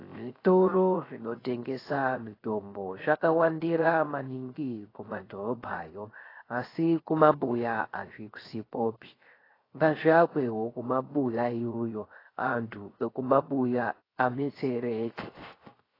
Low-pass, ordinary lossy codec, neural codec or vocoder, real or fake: 5.4 kHz; AAC, 24 kbps; codec, 16 kHz, 0.3 kbps, FocalCodec; fake